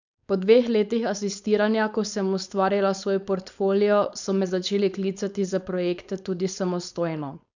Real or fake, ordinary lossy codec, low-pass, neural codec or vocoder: fake; none; 7.2 kHz; codec, 16 kHz, 4.8 kbps, FACodec